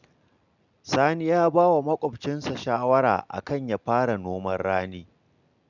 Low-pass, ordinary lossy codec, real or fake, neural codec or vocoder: 7.2 kHz; none; real; none